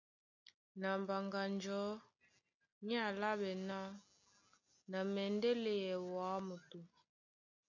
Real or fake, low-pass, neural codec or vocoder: real; 7.2 kHz; none